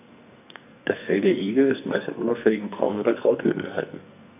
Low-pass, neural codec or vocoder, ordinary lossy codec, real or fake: 3.6 kHz; codec, 44.1 kHz, 2.6 kbps, SNAC; none; fake